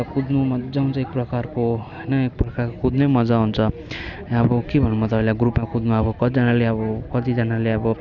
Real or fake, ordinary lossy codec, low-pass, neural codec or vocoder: real; none; 7.2 kHz; none